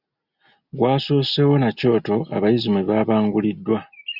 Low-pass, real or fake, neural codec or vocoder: 5.4 kHz; real; none